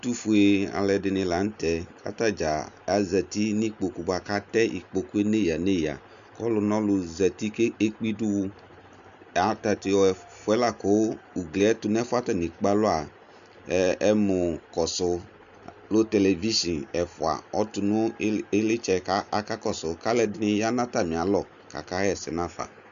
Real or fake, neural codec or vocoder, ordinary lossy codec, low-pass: real; none; MP3, 96 kbps; 7.2 kHz